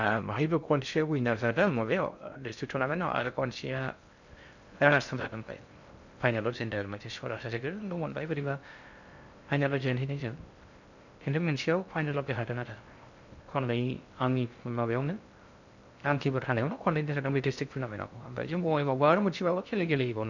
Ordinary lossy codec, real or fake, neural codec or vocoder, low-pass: none; fake; codec, 16 kHz in and 24 kHz out, 0.6 kbps, FocalCodec, streaming, 2048 codes; 7.2 kHz